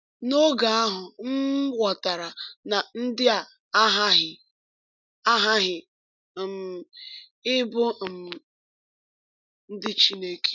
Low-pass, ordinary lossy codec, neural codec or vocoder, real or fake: 7.2 kHz; none; none; real